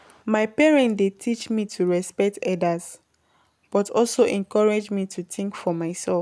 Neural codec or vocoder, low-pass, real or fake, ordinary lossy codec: none; none; real; none